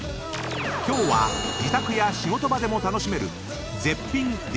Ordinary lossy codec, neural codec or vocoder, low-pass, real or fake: none; none; none; real